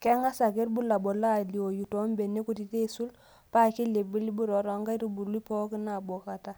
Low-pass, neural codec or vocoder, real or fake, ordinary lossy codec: none; none; real; none